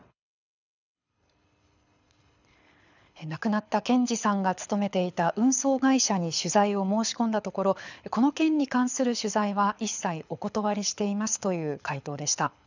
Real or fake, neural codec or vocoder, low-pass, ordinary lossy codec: fake; codec, 24 kHz, 6 kbps, HILCodec; 7.2 kHz; none